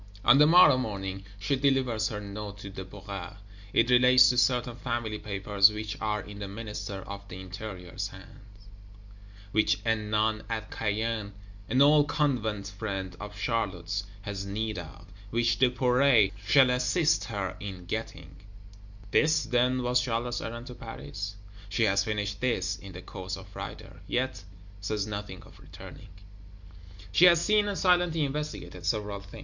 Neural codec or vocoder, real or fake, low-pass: none; real; 7.2 kHz